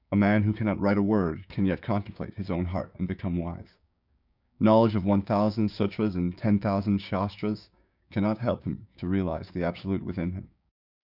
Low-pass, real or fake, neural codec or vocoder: 5.4 kHz; fake; codec, 16 kHz, 6 kbps, DAC